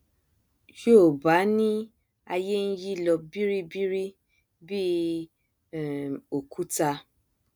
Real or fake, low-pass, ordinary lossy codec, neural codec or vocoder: real; none; none; none